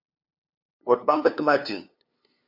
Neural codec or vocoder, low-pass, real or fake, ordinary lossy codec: codec, 16 kHz, 2 kbps, FunCodec, trained on LibriTTS, 25 frames a second; 5.4 kHz; fake; MP3, 48 kbps